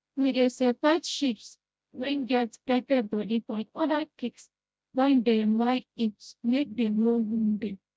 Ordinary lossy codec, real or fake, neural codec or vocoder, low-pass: none; fake; codec, 16 kHz, 0.5 kbps, FreqCodec, smaller model; none